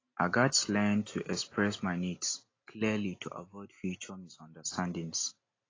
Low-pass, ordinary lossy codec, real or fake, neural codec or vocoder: 7.2 kHz; AAC, 32 kbps; real; none